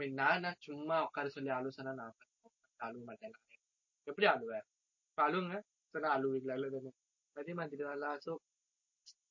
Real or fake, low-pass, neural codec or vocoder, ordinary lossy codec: real; 7.2 kHz; none; MP3, 32 kbps